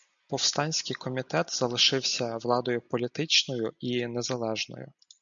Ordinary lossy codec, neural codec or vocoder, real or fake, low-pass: MP3, 96 kbps; none; real; 7.2 kHz